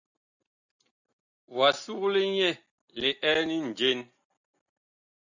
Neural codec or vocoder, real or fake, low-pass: none; real; 7.2 kHz